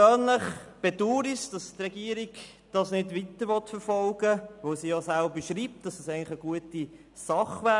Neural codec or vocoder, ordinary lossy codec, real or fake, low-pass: none; MP3, 96 kbps; real; 10.8 kHz